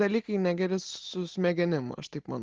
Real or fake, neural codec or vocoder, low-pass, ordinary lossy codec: real; none; 7.2 kHz; Opus, 16 kbps